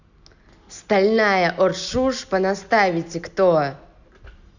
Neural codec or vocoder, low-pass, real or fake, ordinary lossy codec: none; 7.2 kHz; real; none